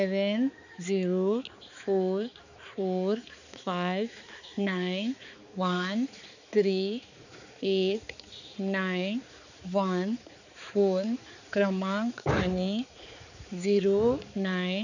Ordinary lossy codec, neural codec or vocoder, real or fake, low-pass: none; codec, 16 kHz, 4 kbps, X-Codec, HuBERT features, trained on balanced general audio; fake; 7.2 kHz